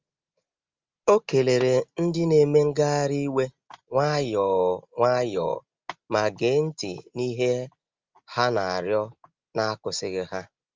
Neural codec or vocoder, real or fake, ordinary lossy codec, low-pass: none; real; Opus, 32 kbps; 7.2 kHz